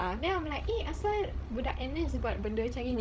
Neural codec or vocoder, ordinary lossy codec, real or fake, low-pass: codec, 16 kHz, 16 kbps, FreqCodec, larger model; none; fake; none